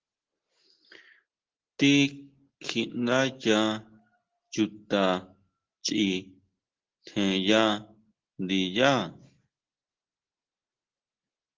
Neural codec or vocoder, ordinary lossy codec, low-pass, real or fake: none; Opus, 16 kbps; 7.2 kHz; real